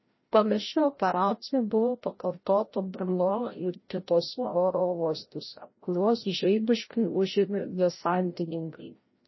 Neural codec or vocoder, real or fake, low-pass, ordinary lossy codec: codec, 16 kHz, 0.5 kbps, FreqCodec, larger model; fake; 7.2 kHz; MP3, 24 kbps